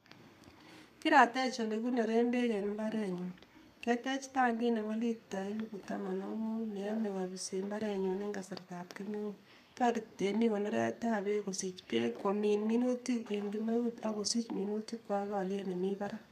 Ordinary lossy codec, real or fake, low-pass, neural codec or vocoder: none; fake; 14.4 kHz; codec, 32 kHz, 1.9 kbps, SNAC